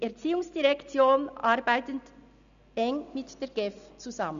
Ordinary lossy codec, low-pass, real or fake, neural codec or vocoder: none; 7.2 kHz; real; none